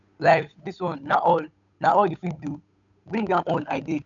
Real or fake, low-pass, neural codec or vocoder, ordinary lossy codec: fake; 7.2 kHz; codec, 16 kHz, 8 kbps, FunCodec, trained on Chinese and English, 25 frames a second; none